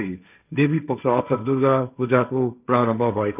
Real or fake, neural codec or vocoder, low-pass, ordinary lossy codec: fake; codec, 16 kHz, 1.1 kbps, Voila-Tokenizer; 3.6 kHz; none